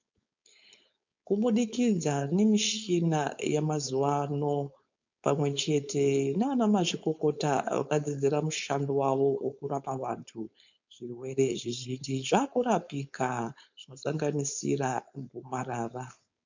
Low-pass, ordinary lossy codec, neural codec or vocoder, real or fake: 7.2 kHz; MP3, 64 kbps; codec, 16 kHz, 4.8 kbps, FACodec; fake